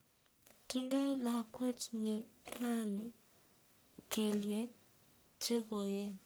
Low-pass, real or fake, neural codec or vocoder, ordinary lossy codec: none; fake; codec, 44.1 kHz, 1.7 kbps, Pupu-Codec; none